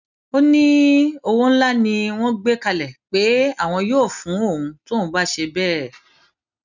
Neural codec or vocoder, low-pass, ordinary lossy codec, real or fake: none; 7.2 kHz; none; real